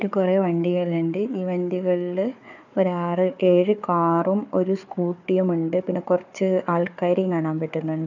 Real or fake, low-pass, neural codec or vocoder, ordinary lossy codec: fake; 7.2 kHz; codec, 16 kHz, 4 kbps, FunCodec, trained on Chinese and English, 50 frames a second; none